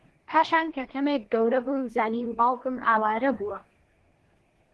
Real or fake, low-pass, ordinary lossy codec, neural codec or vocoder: fake; 10.8 kHz; Opus, 16 kbps; codec, 24 kHz, 1 kbps, SNAC